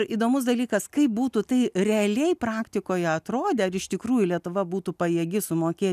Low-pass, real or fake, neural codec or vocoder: 14.4 kHz; real; none